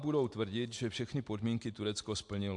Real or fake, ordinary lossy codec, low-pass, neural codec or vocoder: real; MP3, 64 kbps; 10.8 kHz; none